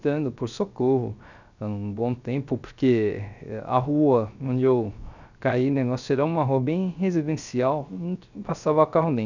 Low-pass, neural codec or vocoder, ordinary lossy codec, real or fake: 7.2 kHz; codec, 16 kHz, 0.3 kbps, FocalCodec; none; fake